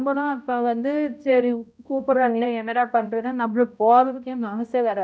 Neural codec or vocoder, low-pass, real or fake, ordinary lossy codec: codec, 16 kHz, 0.5 kbps, X-Codec, HuBERT features, trained on balanced general audio; none; fake; none